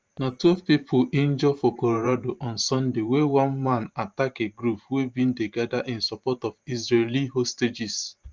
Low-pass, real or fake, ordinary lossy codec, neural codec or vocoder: 7.2 kHz; fake; Opus, 24 kbps; vocoder, 44.1 kHz, 128 mel bands every 512 samples, BigVGAN v2